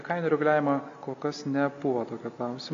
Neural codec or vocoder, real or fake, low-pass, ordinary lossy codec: none; real; 7.2 kHz; AAC, 48 kbps